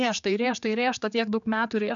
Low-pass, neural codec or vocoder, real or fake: 7.2 kHz; codec, 16 kHz, 4 kbps, X-Codec, HuBERT features, trained on general audio; fake